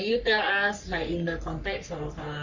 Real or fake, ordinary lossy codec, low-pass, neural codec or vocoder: fake; Opus, 64 kbps; 7.2 kHz; codec, 44.1 kHz, 3.4 kbps, Pupu-Codec